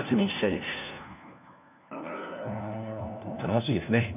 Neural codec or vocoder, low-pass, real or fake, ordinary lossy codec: codec, 16 kHz, 1 kbps, FunCodec, trained on LibriTTS, 50 frames a second; 3.6 kHz; fake; none